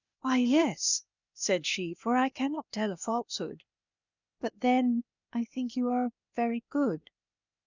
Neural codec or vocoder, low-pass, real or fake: codec, 16 kHz, 0.8 kbps, ZipCodec; 7.2 kHz; fake